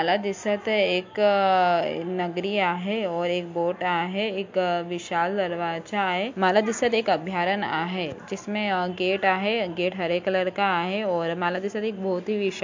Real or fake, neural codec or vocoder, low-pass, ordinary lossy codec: real; none; 7.2 kHz; MP3, 48 kbps